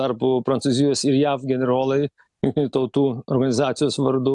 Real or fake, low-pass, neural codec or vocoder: real; 10.8 kHz; none